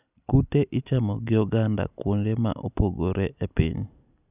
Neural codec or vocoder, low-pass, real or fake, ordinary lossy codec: none; 3.6 kHz; real; none